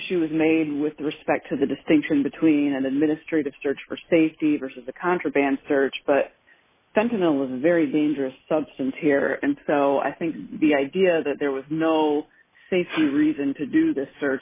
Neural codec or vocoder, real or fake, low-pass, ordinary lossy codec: none; real; 3.6 kHz; MP3, 16 kbps